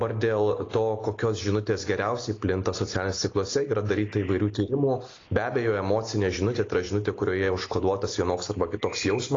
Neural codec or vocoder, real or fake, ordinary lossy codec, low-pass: none; real; AAC, 32 kbps; 7.2 kHz